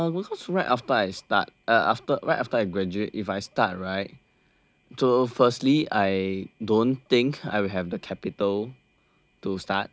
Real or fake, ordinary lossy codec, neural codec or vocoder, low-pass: real; none; none; none